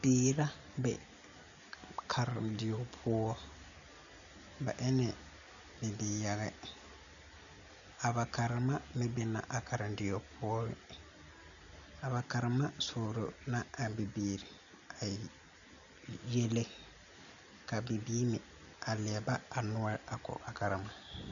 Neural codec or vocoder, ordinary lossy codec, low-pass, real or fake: none; MP3, 96 kbps; 7.2 kHz; real